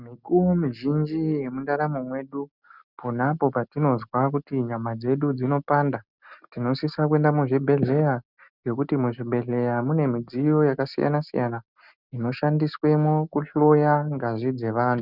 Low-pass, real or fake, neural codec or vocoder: 5.4 kHz; real; none